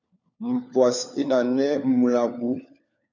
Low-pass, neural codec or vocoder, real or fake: 7.2 kHz; codec, 16 kHz, 4 kbps, FunCodec, trained on LibriTTS, 50 frames a second; fake